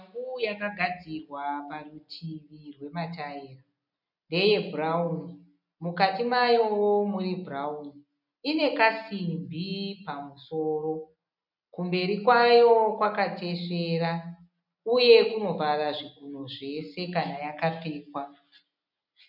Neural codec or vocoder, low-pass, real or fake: none; 5.4 kHz; real